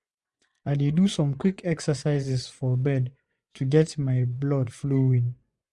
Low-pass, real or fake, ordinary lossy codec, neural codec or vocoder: none; fake; none; vocoder, 24 kHz, 100 mel bands, Vocos